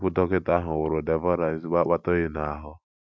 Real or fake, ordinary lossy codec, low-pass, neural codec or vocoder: real; none; none; none